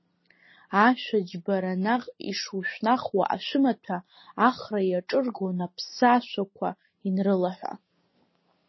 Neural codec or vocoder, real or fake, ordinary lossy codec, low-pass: none; real; MP3, 24 kbps; 7.2 kHz